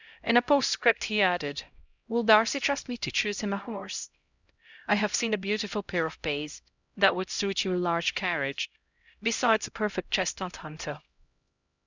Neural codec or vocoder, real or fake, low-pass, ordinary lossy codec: codec, 16 kHz, 0.5 kbps, X-Codec, HuBERT features, trained on LibriSpeech; fake; 7.2 kHz; Opus, 64 kbps